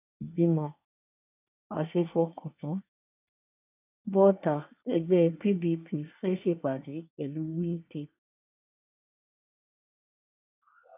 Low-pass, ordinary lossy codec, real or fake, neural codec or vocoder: 3.6 kHz; none; fake; codec, 24 kHz, 1 kbps, SNAC